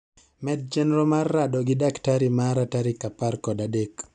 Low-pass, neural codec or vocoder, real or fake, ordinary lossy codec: 9.9 kHz; none; real; none